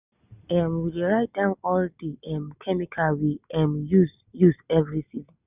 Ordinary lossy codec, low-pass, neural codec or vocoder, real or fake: none; 3.6 kHz; none; real